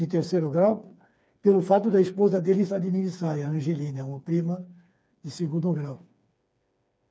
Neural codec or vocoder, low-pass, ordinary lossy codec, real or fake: codec, 16 kHz, 4 kbps, FreqCodec, smaller model; none; none; fake